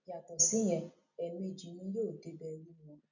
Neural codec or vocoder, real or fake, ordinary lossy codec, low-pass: none; real; none; 7.2 kHz